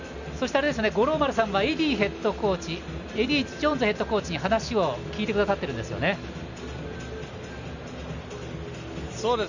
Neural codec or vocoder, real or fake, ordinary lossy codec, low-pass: none; real; none; 7.2 kHz